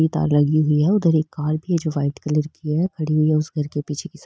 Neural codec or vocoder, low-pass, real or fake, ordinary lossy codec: none; none; real; none